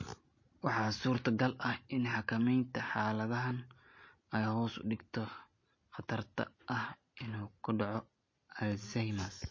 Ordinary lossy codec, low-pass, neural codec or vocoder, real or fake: MP3, 32 kbps; 7.2 kHz; none; real